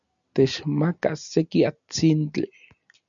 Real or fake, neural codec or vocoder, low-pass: real; none; 7.2 kHz